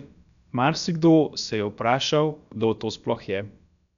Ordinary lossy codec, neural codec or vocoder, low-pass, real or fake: none; codec, 16 kHz, about 1 kbps, DyCAST, with the encoder's durations; 7.2 kHz; fake